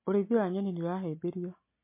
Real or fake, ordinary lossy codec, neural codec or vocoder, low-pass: real; MP3, 24 kbps; none; 3.6 kHz